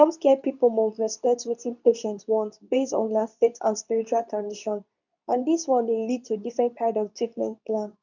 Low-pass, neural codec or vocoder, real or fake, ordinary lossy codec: 7.2 kHz; codec, 24 kHz, 0.9 kbps, WavTokenizer, medium speech release version 2; fake; none